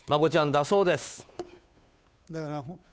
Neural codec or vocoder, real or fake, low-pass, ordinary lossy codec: codec, 16 kHz, 2 kbps, FunCodec, trained on Chinese and English, 25 frames a second; fake; none; none